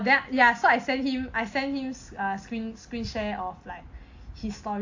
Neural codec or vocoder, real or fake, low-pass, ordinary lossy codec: none; real; 7.2 kHz; AAC, 48 kbps